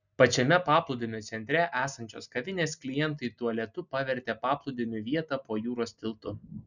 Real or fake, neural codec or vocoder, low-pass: real; none; 7.2 kHz